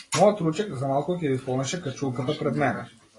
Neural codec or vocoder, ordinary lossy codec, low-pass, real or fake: none; AAC, 32 kbps; 10.8 kHz; real